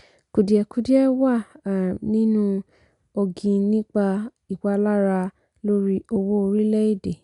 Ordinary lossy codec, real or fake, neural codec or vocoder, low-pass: none; real; none; 10.8 kHz